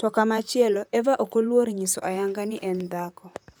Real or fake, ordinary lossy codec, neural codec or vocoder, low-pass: fake; none; vocoder, 44.1 kHz, 128 mel bands, Pupu-Vocoder; none